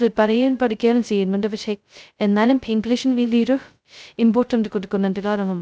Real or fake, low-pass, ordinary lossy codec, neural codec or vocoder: fake; none; none; codec, 16 kHz, 0.2 kbps, FocalCodec